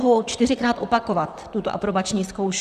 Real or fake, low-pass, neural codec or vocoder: fake; 14.4 kHz; vocoder, 44.1 kHz, 128 mel bands, Pupu-Vocoder